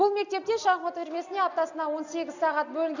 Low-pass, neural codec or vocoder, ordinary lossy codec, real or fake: 7.2 kHz; none; none; real